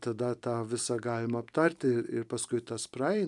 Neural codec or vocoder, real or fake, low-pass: vocoder, 44.1 kHz, 128 mel bands every 256 samples, BigVGAN v2; fake; 10.8 kHz